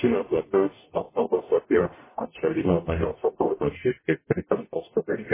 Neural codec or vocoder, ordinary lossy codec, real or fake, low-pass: codec, 44.1 kHz, 0.9 kbps, DAC; MP3, 16 kbps; fake; 3.6 kHz